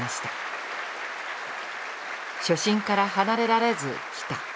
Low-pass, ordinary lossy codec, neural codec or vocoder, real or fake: none; none; none; real